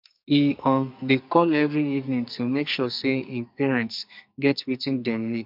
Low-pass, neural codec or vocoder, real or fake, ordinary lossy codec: 5.4 kHz; codec, 44.1 kHz, 2.6 kbps, SNAC; fake; MP3, 48 kbps